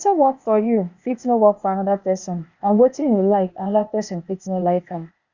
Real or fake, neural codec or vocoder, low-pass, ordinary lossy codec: fake; codec, 16 kHz, 0.8 kbps, ZipCodec; 7.2 kHz; Opus, 64 kbps